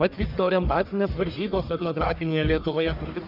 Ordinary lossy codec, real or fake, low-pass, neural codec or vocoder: Opus, 64 kbps; fake; 5.4 kHz; codec, 44.1 kHz, 1.7 kbps, Pupu-Codec